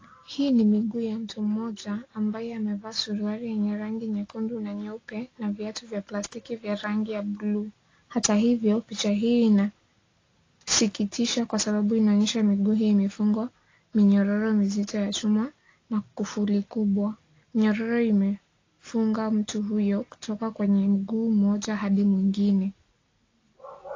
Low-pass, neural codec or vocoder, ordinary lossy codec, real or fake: 7.2 kHz; none; AAC, 32 kbps; real